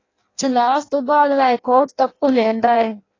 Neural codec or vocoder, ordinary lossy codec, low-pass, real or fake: codec, 16 kHz in and 24 kHz out, 0.6 kbps, FireRedTTS-2 codec; AAC, 32 kbps; 7.2 kHz; fake